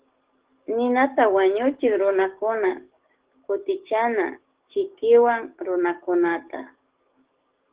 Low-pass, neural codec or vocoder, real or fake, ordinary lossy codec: 3.6 kHz; codec, 44.1 kHz, 7.8 kbps, DAC; fake; Opus, 16 kbps